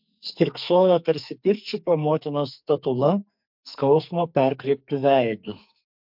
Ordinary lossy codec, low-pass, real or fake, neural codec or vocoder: MP3, 48 kbps; 5.4 kHz; fake; codec, 44.1 kHz, 2.6 kbps, SNAC